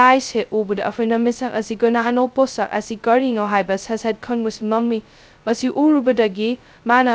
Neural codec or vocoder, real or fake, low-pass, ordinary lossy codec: codec, 16 kHz, 0.2 kbps, FocalCodec; fake; none; none